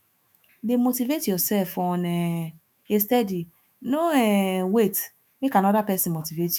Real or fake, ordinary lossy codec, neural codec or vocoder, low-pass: fake; none; autoencoder, 48 kHz, 128 numbers a frame, DAC-VAE, trained on Japanese speech; none